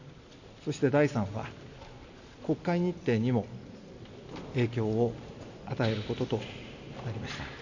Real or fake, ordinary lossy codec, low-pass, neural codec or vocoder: real; none; 7.2 kHz; none